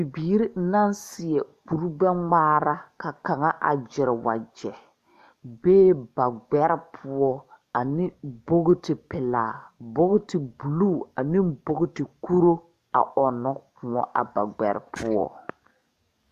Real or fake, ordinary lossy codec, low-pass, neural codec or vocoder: fake; Opus, 64 kbps; 14.4 kHz; codec, 44.1 kHz, 7.8 kbps, DAC